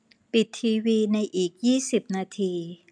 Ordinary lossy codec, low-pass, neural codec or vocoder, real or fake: none; 9.9 kHz; none; real